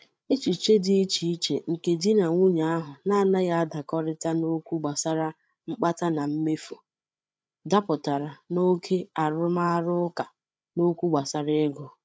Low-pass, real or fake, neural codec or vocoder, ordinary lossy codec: none; fake; codec, 16 kHz, 8 kbps, FreqCodec, larger model; none